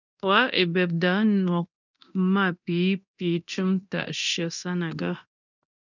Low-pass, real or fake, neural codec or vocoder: 7.2 kHz; fake; codec, 24 kHz, 0.9 kbps, DualCodec